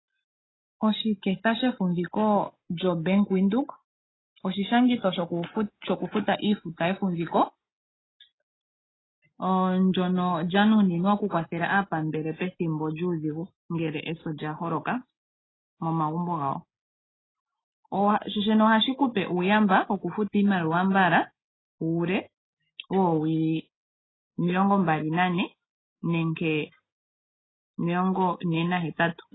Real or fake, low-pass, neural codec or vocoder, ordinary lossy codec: real; 7.2 kHz; none; AAC, 16 kbps